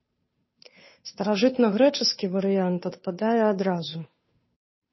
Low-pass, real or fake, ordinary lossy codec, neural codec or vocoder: 7.2 kHz; fake; MP3, 24 kbps; codec, 16 kHz, 2 kbps, FunCodec, trained on Chinese and English, 25 frames a second